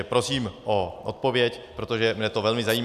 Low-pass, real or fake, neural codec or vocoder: 14.4 kHz; real; none